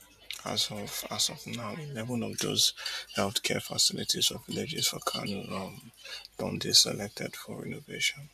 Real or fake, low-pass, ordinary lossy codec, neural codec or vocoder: fake; 14.4 kHz; none; vocoder, 44.1 kHz, 128 mel bands every 512 samples, BigVGAN v2